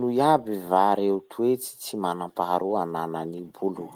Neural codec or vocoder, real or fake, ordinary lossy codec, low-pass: none; real; Opus, 24 kbps; 19.8 kHz